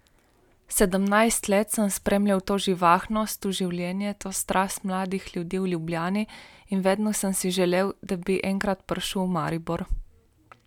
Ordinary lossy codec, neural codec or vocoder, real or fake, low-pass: none; none; real; 19.8 kHz